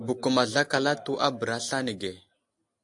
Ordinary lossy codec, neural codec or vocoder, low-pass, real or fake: AAC, 64 kbps; none; 10.8 kHz; real